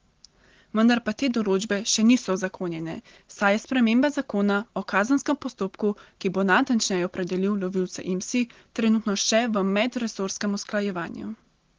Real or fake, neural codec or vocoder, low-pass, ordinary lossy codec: real; none; 7.2 kHz; Opus, 16 kbps